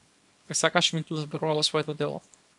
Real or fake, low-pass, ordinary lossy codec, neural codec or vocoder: fake; 10.8 kHz; MP3, 96 kbps; codec, 24 kHz, 0.9 kbps, WavTokenizer, small release